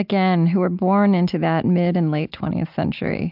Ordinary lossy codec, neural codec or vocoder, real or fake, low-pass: AAC, 48 kbps; none; real; 5.4 kHz